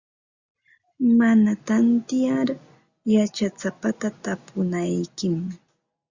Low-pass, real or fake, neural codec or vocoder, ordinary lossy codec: 7.2 kHz; real; none; Opus, 64 kbps